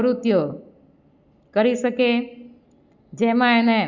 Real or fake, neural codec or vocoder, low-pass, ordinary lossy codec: real; none; 7.2 kHz; Opus, 64 kbps